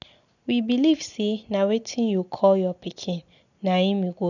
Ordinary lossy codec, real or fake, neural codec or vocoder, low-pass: none; real; none; 7.2 kHz